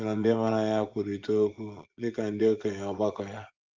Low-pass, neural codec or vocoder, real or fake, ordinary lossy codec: 7.2 kHz; vocoder, 24 kHz, 100 mel bands, Vocos; fake; Opus, 32 kbps